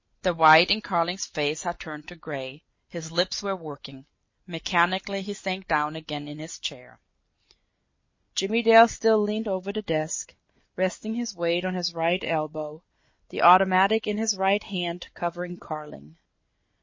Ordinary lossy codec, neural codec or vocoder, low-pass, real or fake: MP3, 32 kbps; none; 7.2 kHz; real